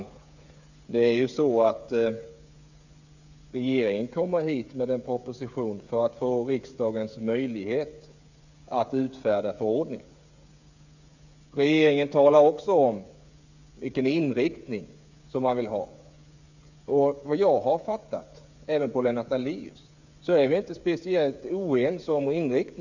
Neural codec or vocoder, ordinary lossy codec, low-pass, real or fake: codec, 16 kHz, 8 kbps, FreqCodec, smaller model; none; 7.2 kHz; fake